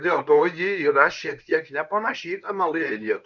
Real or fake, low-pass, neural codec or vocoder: fake; 7.2 kHz; codec, 24 kHz, 0.9 kbps, WavTokenizer, medium speech release version 1